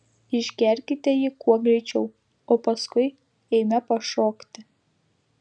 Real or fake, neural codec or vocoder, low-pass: real; none; 9.9 kHz